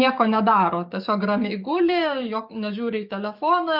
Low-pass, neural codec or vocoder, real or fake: 5.4 kHz; codec, 44.1 kHz, 7.8 kbps, DAC; fake